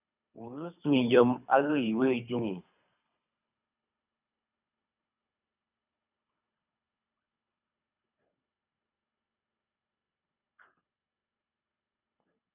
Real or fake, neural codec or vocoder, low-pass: fake; codec, 24 kHz, 3 kbps, HILCodec; 3.6 kHz